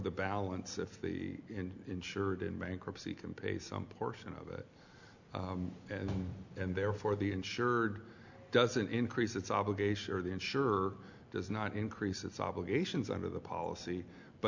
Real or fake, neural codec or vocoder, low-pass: real; none; 7.2 kHz